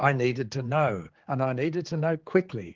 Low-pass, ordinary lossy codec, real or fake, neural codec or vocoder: 7.2 kHz; Opus, 16 kbps; fake; codec, 16 kHz, 4 kbps, X-Codec, HuBERT features, trained on general audio